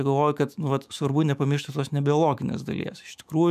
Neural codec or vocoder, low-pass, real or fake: autoencoder, 48 kHz, 128 numbers a frame, DAC-VAE, trained on Japanese speech; 14.4 kHz; fake